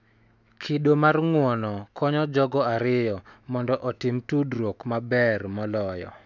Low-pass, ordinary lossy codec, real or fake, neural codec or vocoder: 7.2 kHz; none; fake; autoencoder, 48 kHz, 128 numbers a frame, DAC-VAE, trained on Japanese speech